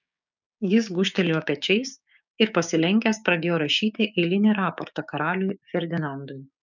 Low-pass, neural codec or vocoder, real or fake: 7.2 kHz; codec, 16 kHz, 6 kbps, DAC; fake